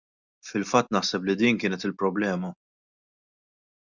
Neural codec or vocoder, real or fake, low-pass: none; real; 7.2 kHz